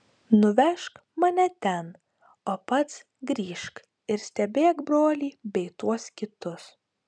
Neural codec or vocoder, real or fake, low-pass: none; real; 9.9 kHz